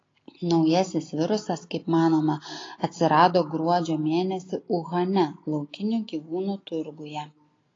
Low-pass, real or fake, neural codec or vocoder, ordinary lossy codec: 7.2 kHz; real; none; AAC, 32 kbps